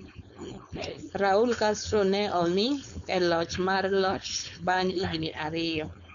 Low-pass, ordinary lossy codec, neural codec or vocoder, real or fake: 7.2 kHz; none; codec, 16 kHz, 4.8 kbps, FACodec; fake